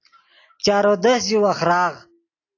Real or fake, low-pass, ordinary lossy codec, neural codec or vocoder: real; 7.2 kHz; AAC, 32 kbps; none